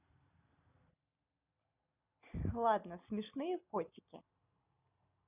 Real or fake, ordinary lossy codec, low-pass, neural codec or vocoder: real; none; 3.6 kHz; none